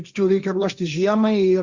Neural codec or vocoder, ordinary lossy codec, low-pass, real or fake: codec, 16 kHz, 1.1 kbps, Voila-Tokenizer; Opus, 64 kbps; 7.2 kHz; fake